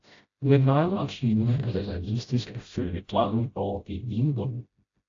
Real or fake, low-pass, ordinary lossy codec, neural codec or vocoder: fake; 7.2 kHz; AAC, 48 kbps; codec, 16 kHz, 0.5 kbps, FreqCodec, smaller model